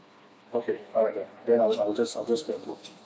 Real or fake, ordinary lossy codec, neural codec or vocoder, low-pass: fake; none; codec, 16 kHz, 2 kbps, FreqCodec, smaller model; none